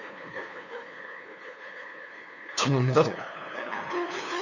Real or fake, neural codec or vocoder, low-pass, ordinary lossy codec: fake; codec, 16 kHz, 2 kbps, FunCodec, trained on LibriTTS, 25 frames a second; 7.2 kHz; none